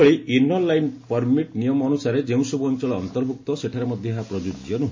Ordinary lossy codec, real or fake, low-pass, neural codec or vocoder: MP3, 32 kbps; real; 7.2 kHz; none